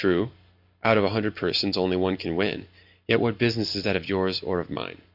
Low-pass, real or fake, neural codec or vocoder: 5.4 kHz; real; none